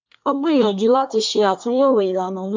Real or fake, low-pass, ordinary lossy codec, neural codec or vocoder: fake; 7.2 kHz; MP3, 64 kbps; codec, 24 kHz, 1 kbps, SNAC